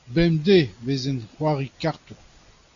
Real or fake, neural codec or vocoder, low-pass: real; none; 7.2 kHz